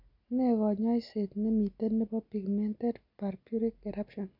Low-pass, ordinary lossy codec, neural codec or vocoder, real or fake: 5.4 kHz; none; none; real